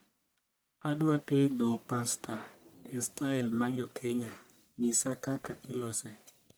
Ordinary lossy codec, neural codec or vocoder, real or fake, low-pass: none; codec, 44.1 kHz, 1.7 kbps, Pupu-Codec; fake; none